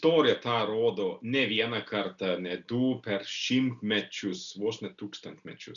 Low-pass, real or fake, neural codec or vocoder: 7.2 kHz; real; none